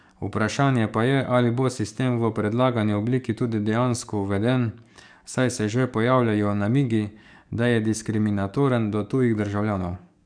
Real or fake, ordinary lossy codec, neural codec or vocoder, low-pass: fake; none; codec, 44.1 kHz, 7.8 kbps, DAC; 9.9 kHz